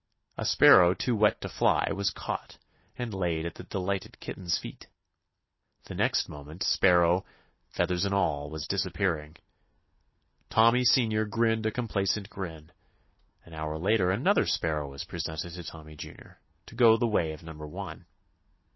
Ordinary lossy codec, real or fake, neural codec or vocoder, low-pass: MP3, 24 kbps; real; none; 7.2 kHz